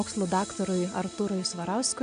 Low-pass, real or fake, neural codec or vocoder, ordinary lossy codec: 9.9 kHz; real; none; AAC, 64 kbps